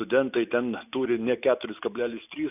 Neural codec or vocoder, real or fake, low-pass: none; real; 3.6 kHz